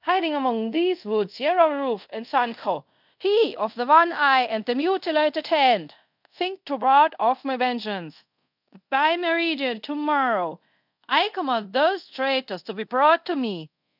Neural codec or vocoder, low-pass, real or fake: codec, 24 kHz, 0.5 kbps, DualCodec; 5.4 kHz; fake